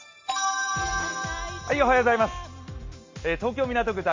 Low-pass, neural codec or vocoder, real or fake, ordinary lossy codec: 7.2 kHz; none; real; MP3, 48 kbps